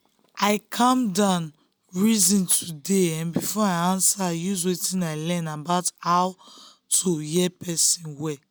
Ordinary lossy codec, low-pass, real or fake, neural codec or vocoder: none; none; real; none